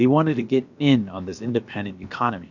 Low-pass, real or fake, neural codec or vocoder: 7.2 kHz; fake; codec, 16 kHz, about 1 kbps, DyCAST, with the encoder's durations